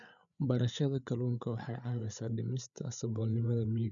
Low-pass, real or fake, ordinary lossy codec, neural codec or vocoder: 7.2 kHz; fake; none; codec, 16 kHz, 8 kbps, FreqCodec, larger model